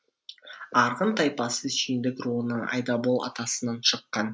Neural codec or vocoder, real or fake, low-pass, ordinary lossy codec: none; real; none; none